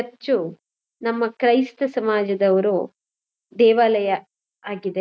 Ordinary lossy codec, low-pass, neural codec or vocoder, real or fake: none; none; none; real